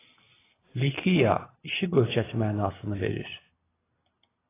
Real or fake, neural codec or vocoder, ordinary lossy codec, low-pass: real; none; AAC, 16 kbps; 3.6 kHz